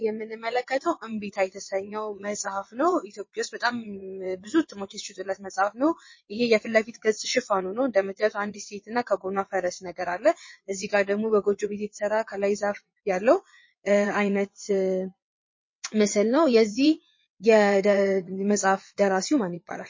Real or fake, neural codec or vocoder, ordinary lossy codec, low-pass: fake; vocoder, 22.05 kHz, 80 mel bands, WaveNeXt; MP3, 32 kbps; 7.2 kHz